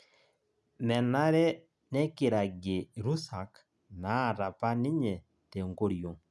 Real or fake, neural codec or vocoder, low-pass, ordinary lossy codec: real; none; none; none